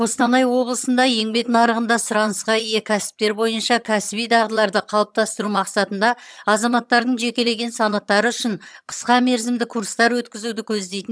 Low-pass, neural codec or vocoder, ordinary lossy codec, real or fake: none; vocoder, 22.05 kHz, 80 mel bands, HiFi-GAN; none; fake